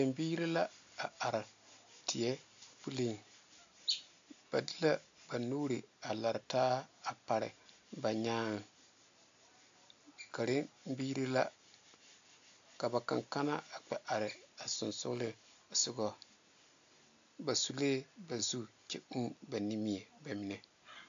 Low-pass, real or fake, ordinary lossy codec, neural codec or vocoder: 7.2 kHz; real; AAC, 64 kbps; none